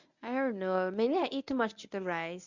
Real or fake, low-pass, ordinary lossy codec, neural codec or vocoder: fake; 7.2 kHz; none; codec, 24 kHz, 0.9 kbps, WavTokenizer, medium speech release version 1